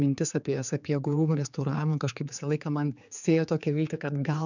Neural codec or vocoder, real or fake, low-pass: codec, 16 kHz, 4 kbps, X-Codec, HuBERT features, trained on general audio; fake; 7.2 kHz